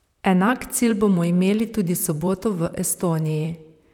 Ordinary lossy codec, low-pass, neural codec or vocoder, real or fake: none; 19.8 kHz; vocoder, 44.1 kHz, 128 mel bands, Pupu-Vocoder; fake